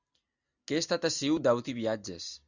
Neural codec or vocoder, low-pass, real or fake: none; 7.2 kHz; real